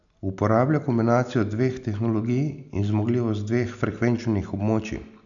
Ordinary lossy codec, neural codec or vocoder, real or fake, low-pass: none; none; real; 7.2 kHz